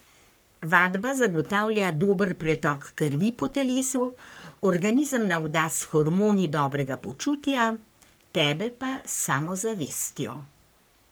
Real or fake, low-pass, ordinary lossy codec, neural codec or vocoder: fake; none; none; codec, 44.1 kHz, 3.4 kbps, Pupu-Codec